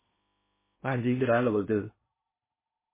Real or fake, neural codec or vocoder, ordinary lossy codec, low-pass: fake; codec, 16 kHz in and 24 kHz out, 0.8 kbps, FocalCodec, streaming, 65536 codes; MP3, 16 kbps; 3.6 kHz